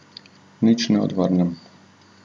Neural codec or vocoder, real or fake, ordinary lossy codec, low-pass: none; real; none; 10.8 kHz